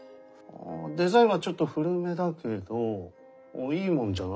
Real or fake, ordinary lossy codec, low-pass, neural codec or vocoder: real; none; none; none